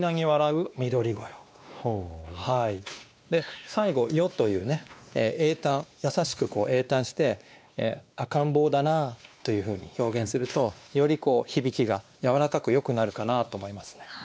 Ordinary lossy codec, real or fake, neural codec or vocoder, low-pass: none; fake; codec, 16 kHz, 2 kbps, X-Codec, WavLM features, trained on Multilingual LibriSpeech; none